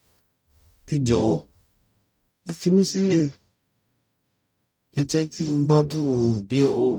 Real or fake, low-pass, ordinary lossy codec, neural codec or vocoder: fake; 19.8 kHz; none; codec, 44.1 kHz, 0.9 kbps, DAC